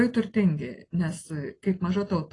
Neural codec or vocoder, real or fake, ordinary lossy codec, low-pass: none; real; AAC, 32 kbps; 10.8 kHz